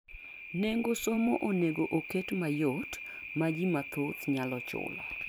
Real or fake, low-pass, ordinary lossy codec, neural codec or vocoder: fake; none; none; vocoder, 44.1 kHz, 128 mel bands every 256 samples, BigVGAN v2